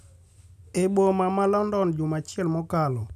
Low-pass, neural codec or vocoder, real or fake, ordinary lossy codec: 14.4 kHz; none; real; none